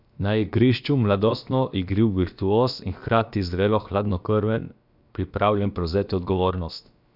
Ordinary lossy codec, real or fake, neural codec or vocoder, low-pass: none; fake; codec, 16 kHz, about 1 kbps, DyCAST, with the encoder's durations; 5.4 kHz